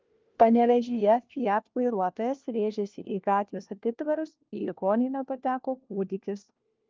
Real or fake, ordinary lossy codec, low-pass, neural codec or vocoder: fake; Opus, 32 kbps; 7.2 kHz; codec, 16 kHz, 1 kbps, FunCodec, trained on LibriTTS, 50 frames a second